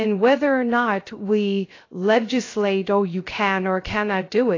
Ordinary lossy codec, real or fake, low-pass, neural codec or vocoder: AAC, 32 kbps; fake; 7.2 kHz; codec, 16 kHz, 0.3 kbps, FocalCodec